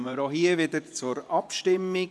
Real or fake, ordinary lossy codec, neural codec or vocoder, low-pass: fake; none; vocoder, 24 kHz, 100 mel bands, Vocos; none